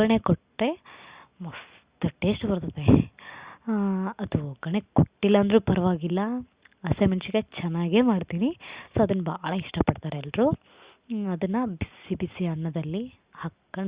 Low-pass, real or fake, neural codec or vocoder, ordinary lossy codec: 3.6 kHz; real; none; Opus, 64 kbps